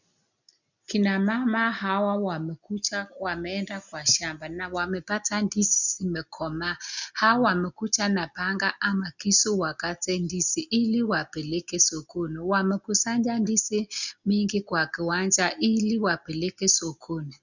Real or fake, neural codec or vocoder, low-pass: real; none; 7.2 kHz